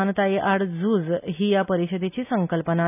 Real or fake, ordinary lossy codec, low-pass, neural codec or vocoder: real; none; 3.6 kHz; none